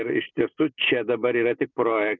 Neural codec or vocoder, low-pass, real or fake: none; 7.2 kHz; real